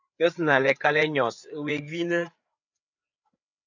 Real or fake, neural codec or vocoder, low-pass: fake; codec, 16 kHz, 4 kbps, FreqCodec, larger model; 7.2 kHz